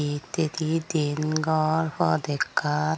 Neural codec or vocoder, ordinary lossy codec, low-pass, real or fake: none; none; none; real